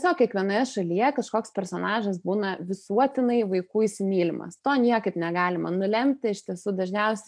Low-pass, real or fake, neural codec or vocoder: 9.9 kHz; real; none